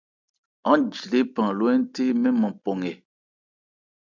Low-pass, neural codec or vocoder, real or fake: 7.2 kHz; none; real